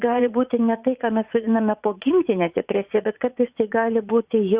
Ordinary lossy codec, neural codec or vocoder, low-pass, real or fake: Opus, 64 kbps; vocoder, 44.1 kHz, 80 mel bands, Vocos; 3.6 kHz; fake